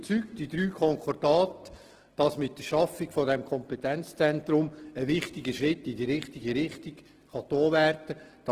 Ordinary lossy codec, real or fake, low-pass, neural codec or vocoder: Opus, 16 kbps; real; 14.4 kHz; none